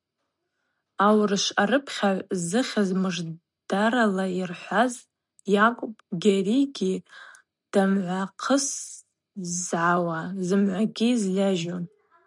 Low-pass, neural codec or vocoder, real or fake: 10.8 kHz; none; real